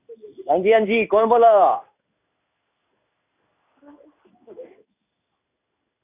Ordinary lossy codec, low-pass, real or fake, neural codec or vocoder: none; 3.6 kHz; fake; codec, 16 kHz in and 24 kHz out, 1 kbps, XY-Tokenizer